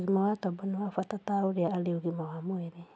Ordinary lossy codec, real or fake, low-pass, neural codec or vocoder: none; real; none; none